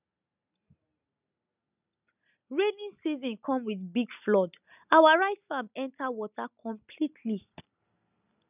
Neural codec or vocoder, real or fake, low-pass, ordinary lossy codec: none; real; 3.6 kHz; none